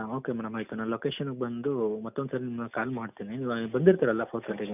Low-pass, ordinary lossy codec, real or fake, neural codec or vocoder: 3.6 kHz; none; real; none